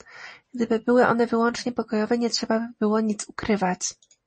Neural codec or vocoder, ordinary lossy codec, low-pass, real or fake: none; MP3, 32 kbps; 9.9 kHz; real